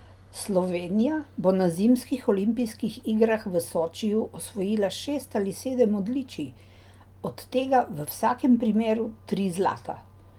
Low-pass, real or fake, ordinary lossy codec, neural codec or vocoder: 19.8 kHz; real; Opus, 32 kbps; none